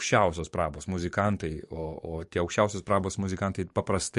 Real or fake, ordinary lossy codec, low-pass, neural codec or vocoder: fake; MP3, 48 kbps; 14.4 kHz; codec, 44.1 kHz, 7.8 kbps, DAC